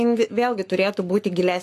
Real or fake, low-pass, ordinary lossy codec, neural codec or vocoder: fake; 14.4 kHz; AAC, 64 kbps; codec, 44.1 kHz, 7.8 kbps, Pupu-Codec